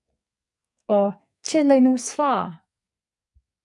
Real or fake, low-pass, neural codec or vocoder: fake; 10.8 kHz; codec, 44.1 kHz, 2.6 kbps, SNAC